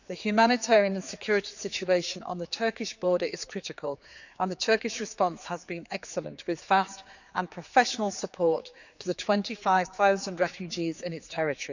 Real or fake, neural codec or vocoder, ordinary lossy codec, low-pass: fake; codec, 16 kHz, 4 kbps, X-Codec, HuBERT features, trained on general audio; none; 7.2 kHz